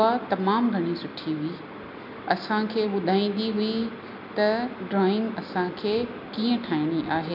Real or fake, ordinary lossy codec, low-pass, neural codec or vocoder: real; MP3, 48 kbps; 5.4 kHz; none